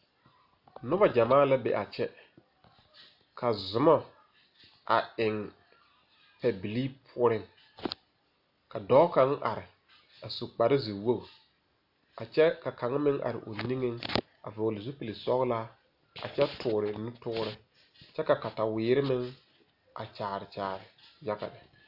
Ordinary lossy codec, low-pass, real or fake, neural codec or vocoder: AAC, 48 kbps; 5.4 kHz; real; none